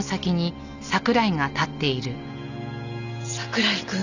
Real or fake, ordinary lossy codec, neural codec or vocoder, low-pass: real; none; none; 7.2 kHz